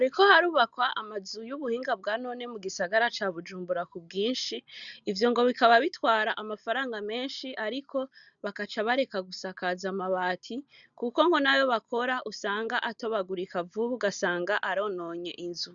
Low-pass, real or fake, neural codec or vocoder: 7.2 kHz; real; none